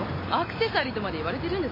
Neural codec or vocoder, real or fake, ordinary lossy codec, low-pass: none; real; MP3, 24 kbps; 5.4 kHz